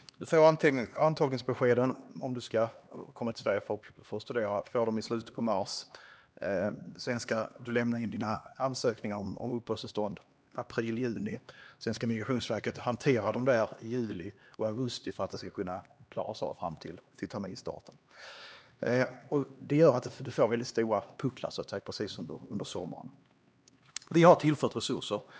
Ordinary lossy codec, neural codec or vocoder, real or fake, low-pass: none; codec, 16 kHz, 2 kbps, X-Codec, HuBERT features, trained on LibriSpeech; fake; none